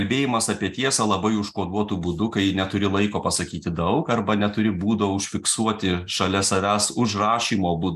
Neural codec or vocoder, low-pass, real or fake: none; 14.4 kHz; real